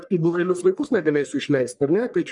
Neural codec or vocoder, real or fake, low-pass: codec, 44.1 kHz, 1.7 kbps, Pupu-Codec; fake; 10.8 kHz